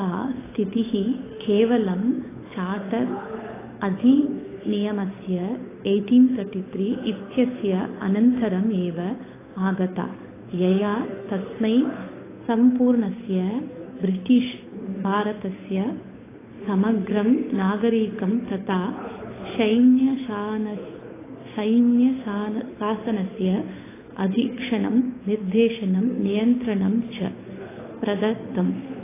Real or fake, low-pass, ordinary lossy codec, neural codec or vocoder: fake; 3.6 kHz; AAC, 16 kbps; codec, 16 kHz, 8 kbps, FunCodec, trained on Chinese and English, 25 frames a second